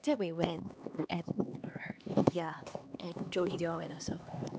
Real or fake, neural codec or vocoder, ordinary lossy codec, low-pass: fake; codec, 16 kHz, 2 kbps, X-Codec, HuBERT features, trained on LibriSpeech; none; none